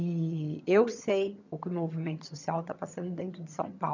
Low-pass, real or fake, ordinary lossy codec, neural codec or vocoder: 7.2 kHz; fake; none; vocoder, 22.05 kHz, 80 mel bands, HiFi-GAN